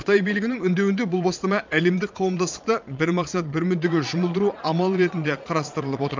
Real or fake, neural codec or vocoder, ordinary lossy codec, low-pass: fake; vocoder, 22.05 kHz, 80 mel bands, Vocos; MP3, 64 kbps; 7.2 kHz